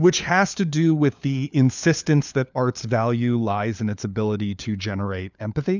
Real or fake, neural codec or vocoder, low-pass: fake; codec, 16 kHz, 4 kbps, FunCodec, trained on LibriTTS, 50 frames a second; 7.2 kHz